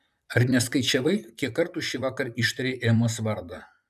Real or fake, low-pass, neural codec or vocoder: fake; 14.4 kHz; vocoder, 44.1 kHz, 128 mel bands, Pupu-Vocoder